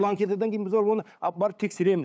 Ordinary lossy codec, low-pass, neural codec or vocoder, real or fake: none; none; codec, 16 kHz, 8 kbps, FunCodec, trained on LibriTTS, 25 frames a second; fake